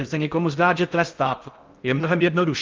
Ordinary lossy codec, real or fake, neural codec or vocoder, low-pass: Opus, 32 kbps; fake; codec, 16 kHz in and 24 kHz out, 0.6 kbps, FocalCodec, streaming, 4096 codes; 7.2 kHz